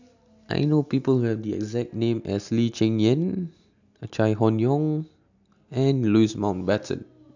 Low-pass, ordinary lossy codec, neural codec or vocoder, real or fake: 7.2 kHz; none; none; real